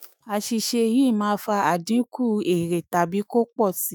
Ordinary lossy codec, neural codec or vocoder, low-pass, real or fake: none; autoencoder, 48 kHz, 128 numbers a frame, DAC-VAE, trained on Japanese speech; none; fake